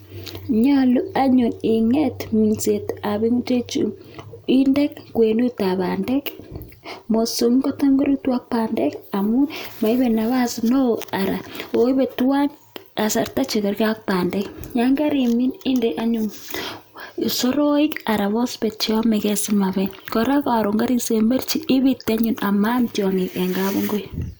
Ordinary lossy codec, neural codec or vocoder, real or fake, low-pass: none; none; real; none